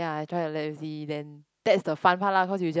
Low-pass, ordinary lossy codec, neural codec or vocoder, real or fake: none; none; none; real